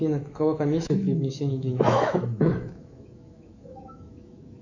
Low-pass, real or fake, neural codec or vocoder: 7.2 kHz; fake; autoencoder, 48 kHz, 128 numbers a frame, DAC-VAE, trained on Japanese speech